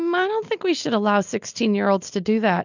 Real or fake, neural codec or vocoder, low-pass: real; none; 7.2 kHz